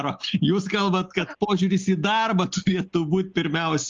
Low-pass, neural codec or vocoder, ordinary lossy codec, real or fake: 7.2 kHz; none; Opus, 32 kbps; real